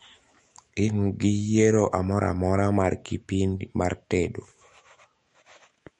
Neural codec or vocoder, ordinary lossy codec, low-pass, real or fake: autoencoder, 48 kHz, 128 numbers a frame, DAC-VAE, trained on Japanese speech; MP3, 48 kbps; 19.8 kHz; fake